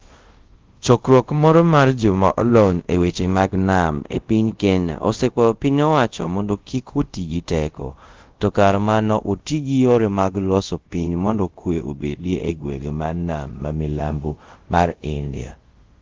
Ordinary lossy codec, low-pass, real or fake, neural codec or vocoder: Opus, 16 kbps; 7.2 kHz; fake; codec, 24 kHz, 0.5 kbps, DualCodec